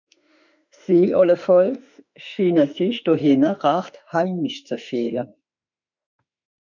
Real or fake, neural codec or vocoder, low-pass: fake; autoencoder, 48 kHz, 32 numbers a frame, DAC-VAE, trained on Japanese speech; 7.2 kHz